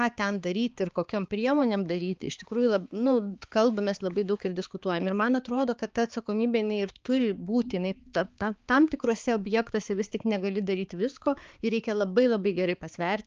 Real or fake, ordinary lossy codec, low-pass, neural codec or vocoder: fake; Opus, 24 kbps; 7.2 kHz; codec, 16 kHz, 4 kbps, X-Codec, HuBERT features, trained on balanced general audio